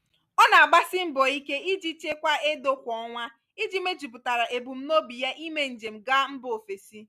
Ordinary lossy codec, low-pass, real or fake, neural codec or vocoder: none; 14.4 kHz; real; none